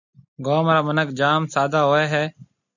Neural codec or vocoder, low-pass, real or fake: none; 7.2 kHz; real